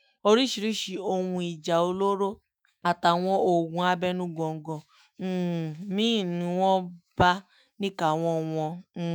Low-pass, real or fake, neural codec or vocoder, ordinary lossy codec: none; fake; autoencoder, 48 kHz, 128 numbers a frame, DAC-VAE, trained on Japanese speech; none